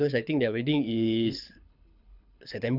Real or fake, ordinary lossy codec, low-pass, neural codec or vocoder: fake; none; 5.4 kHz; codec, 24 kHz, 6 kbps, HILCodec